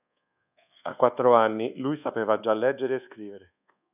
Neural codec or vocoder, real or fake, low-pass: codec, 24 kHz, 1.2 kbps, DualCodec; fake; 3.6 kHz